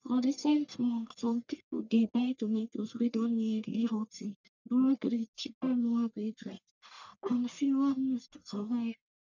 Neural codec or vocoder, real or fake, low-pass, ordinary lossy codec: codec, 44.1 kHz, 1.7 kbps, Pupu-Codec; fake; 7.2 kHz; none